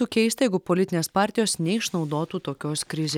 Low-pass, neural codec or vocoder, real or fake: 19.8 kHz; none; real